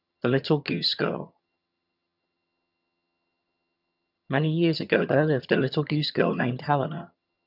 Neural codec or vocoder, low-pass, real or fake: vocoder, 22.05 kHz, 80 mel bands, HiFi-GAN; 5.4 kHz; fake